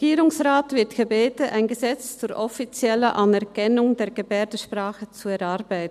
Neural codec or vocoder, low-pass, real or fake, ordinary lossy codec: none; 14.4 kHz; real; none